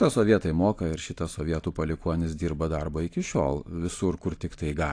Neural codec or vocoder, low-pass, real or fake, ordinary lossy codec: none; 9.9 kHz; real; AAC, 48 kbps